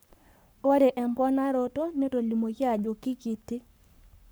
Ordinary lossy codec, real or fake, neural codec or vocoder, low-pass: none; fake; codec, 44.1 kHz, 7.8 kbps, Pupu-Codec; none